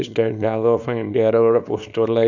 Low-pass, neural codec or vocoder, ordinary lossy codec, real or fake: 7.2 kHz; codec, 24 kHz, 0.9 kbps, WavTokenizer, small release; none; fake